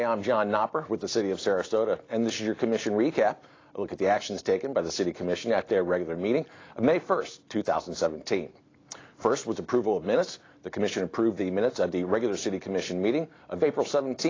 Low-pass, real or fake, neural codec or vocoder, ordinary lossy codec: 7.2 kHz; real; none; AAC, 32 kbps